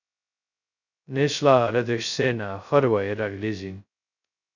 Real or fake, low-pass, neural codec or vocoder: fake; 7.2 kHz; codec, 16 kHz, 0.2 kbps, FocalCodec